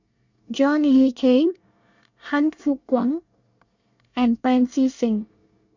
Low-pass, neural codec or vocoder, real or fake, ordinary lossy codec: 7.2 kHz; codec, 24 kHz, 1 kbps, SNAC; fake; none